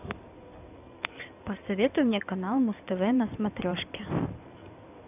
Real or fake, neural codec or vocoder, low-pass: fake; codec, 16 kHz in and 24 kHz out, 2.2 kbps, FireRedTTS-2 codec; 3.6 kHz